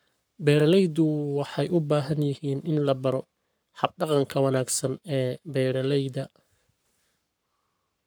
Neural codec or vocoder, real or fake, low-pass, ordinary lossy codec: codec, 44.1 kHz, 7.8 kbps, Pupu-Codec; fake; none; none